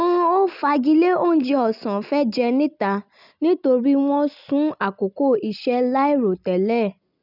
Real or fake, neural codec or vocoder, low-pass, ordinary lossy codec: real; none; 5.4 kHz; none